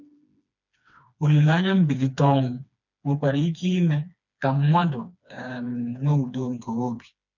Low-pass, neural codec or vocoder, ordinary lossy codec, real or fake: 7.2 kHz; codec, 16 kHz, 2 kbps, FreqCodec, smaller model; none; fake